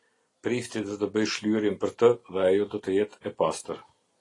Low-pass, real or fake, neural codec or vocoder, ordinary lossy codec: 10.8 kHz; real; none; AAC, 32 kbps